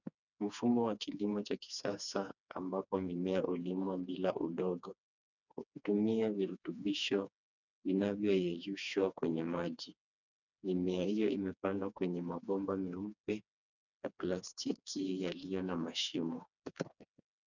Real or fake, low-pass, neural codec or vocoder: fake; 7.2 kHz; codec, 16 kHz, 2 kbps, FreqCodec, smaller model